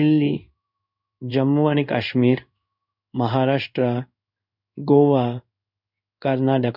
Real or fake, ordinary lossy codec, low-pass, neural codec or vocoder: fake; none; 5.4 kHz; codec, 16 kHz in and 24 kHz out, 1 kbps, XY-Tokenizer